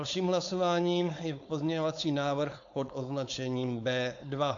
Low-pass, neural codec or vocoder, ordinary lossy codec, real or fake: 7.2 kHz; codec, 16 kHz, 4.8 kbps, FACodec; AAC, 64 kbps; fake